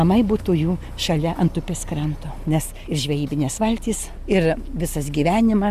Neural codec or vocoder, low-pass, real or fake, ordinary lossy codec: none; 14.4 kHz; real; Opus, 64 kbps